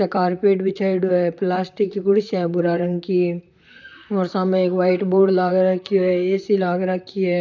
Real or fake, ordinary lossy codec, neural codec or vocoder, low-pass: fake; none; vocoder, 44.1 kHz, 128 mel bands, Pupu-Vocoder; 7.2 kHz